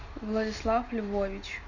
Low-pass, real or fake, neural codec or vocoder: 7.2 kHz; real; none